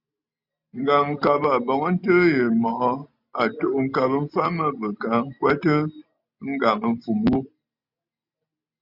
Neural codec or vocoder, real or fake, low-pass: none; real; 5.4 kHz